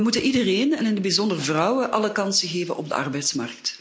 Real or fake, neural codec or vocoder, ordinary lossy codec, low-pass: real; none; none; none